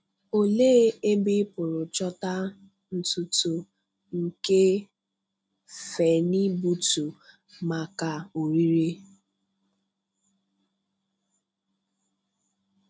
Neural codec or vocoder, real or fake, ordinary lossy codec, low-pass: none; real; none; none